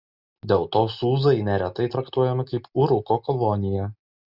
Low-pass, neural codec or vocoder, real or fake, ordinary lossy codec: 5.4 kHz; none; real; Opus, 64 kbps